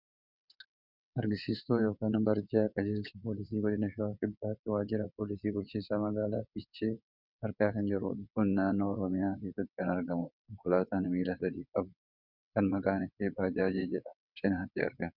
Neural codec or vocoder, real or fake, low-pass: vocoder, 22.05 kHz, 80 mel bands, WaveNeXt; fake; 5.4 kHz